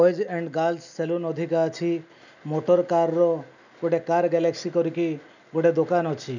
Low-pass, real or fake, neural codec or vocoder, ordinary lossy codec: 7.2 kHz; real; none; none